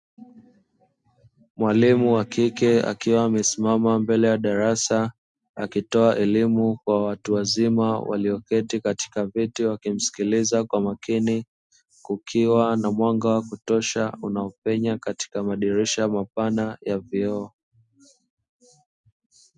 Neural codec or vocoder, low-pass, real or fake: none; 10.8 kHz; real